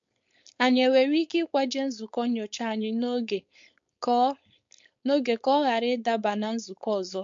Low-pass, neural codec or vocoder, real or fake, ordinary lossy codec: 7.2 kHz; codec, 16 kHz, 4.8 kbps, FACodec; fake; MP3, 48 kbps